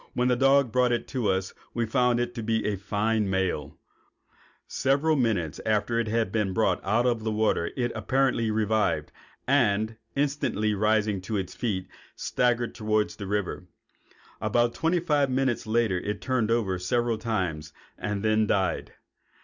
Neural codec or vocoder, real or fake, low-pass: none; real; 7.2 kHz